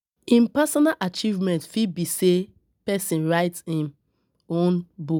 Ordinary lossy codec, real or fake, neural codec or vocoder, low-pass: none; real; none; none